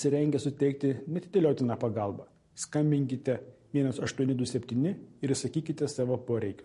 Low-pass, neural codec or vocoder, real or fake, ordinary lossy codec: 10.8 kHz; none; real; MP3, 48 kbps